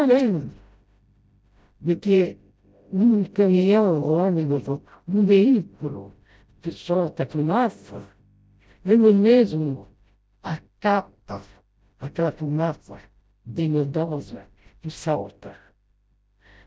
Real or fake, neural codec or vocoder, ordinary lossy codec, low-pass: fake; codec, 16 kHz, 0.5 kbps, FreqCodec, smaller model; none; none